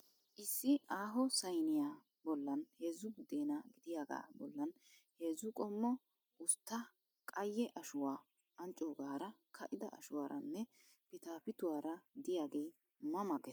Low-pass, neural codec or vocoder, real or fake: 19.8 kHz; none; real